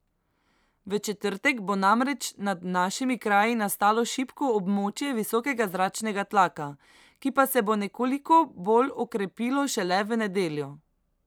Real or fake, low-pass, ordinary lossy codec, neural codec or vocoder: fake; none; none; vocoder, 44.1 kHz, 128 mel bands every 256 samples, BigVGAN v2